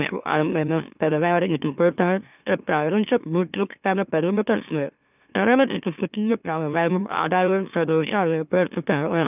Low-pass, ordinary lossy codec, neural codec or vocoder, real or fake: 3.6 kHz; none; autoencoder, 44.1 kHz, a latent of 192 numbers a frame, MeloTTS; fake